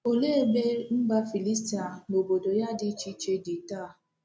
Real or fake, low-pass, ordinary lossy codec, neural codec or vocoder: real; none; none; none